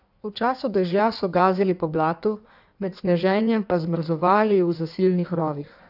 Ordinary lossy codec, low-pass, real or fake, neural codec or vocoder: none; 5.4 kHz; fake; codec, 16 kHz in and 24 kHz out, 1.1 kbps, FireRedTTS-2 codec